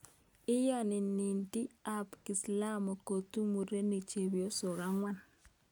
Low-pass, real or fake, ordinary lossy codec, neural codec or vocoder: none; real; none; none